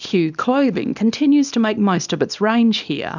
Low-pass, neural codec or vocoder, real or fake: 7.2 kHz; codec, 24 kHz, 0.9 kbps, WavTokenizer, small release; fake